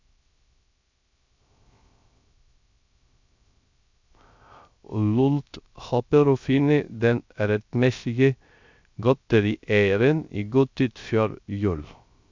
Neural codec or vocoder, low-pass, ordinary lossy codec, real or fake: codec, 16 kHz, 0.3 kbps, FocalCodec; 7.2 kHz; MP3, 64 kbps; fake